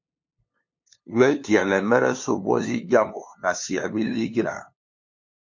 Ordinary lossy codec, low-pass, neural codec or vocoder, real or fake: MP3, 48 kbps; 7.2 kHz; codec, 16 kHz, 2 kbps, FunCodec, trained on LibriTTS, 25 frames a second; fake